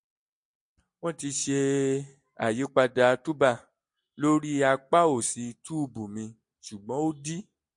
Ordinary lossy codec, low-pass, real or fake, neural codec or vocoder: MP3, 48 kbps; 10.8 kHz; real; none